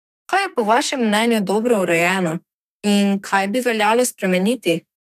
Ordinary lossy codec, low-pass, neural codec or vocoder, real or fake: none; 14.4 kHz; codec, 32 kHz, 1.9 kbps, SNAC; fake